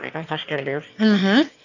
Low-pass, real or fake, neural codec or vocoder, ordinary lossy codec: 7.2 kHz; fake; autoencoder, 22.05 kHz, a latent of 192 numbers a frame, VITS, trained on one speaker; none